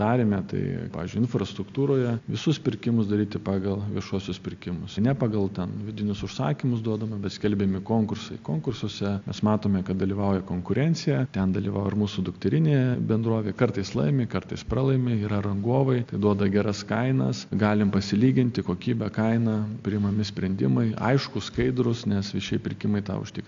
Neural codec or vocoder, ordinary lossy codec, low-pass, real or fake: none; AAC, 64 kbps; 7.2 kHz; real